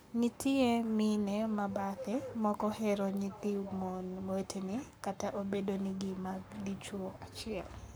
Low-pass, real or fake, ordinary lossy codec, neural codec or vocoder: none; fake; none; codec, 44.1 kHz, 7.8 kbps, Pupu-Codec